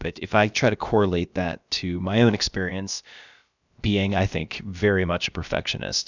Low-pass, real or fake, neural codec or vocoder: 7.2 kHz; fake; codec, 16 kHz, about 1 kbps, DyCAST, with the encoder's durations